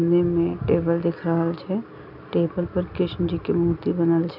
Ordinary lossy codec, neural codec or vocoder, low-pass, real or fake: none; none; 5.4 kHz; real